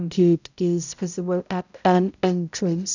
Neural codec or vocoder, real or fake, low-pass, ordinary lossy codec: codec, 16 kHz, 0.5 kbps, X-Codec, HuBERT features, trained on balanced general audio; fake; 7.2 kHz; none